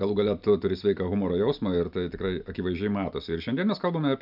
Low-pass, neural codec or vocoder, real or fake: 5.4 kHz; none; real